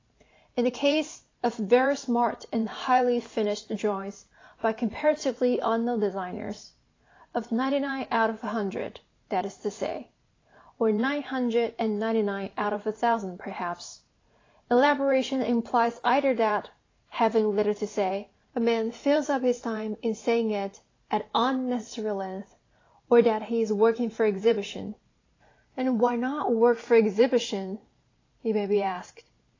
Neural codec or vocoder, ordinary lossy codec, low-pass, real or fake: vocoder, 44.1 kHz, 128 mel bands every 512 samples, BigVGAN v2; AAC, 32 kbps; 7.2 kHz; fake